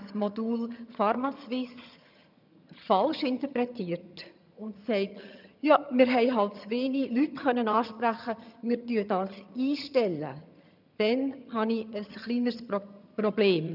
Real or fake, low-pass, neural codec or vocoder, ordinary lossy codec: fake; 5.4 kHz; vocoder, 22.05 kHz, 80 mel bands, HiFi-GAN; none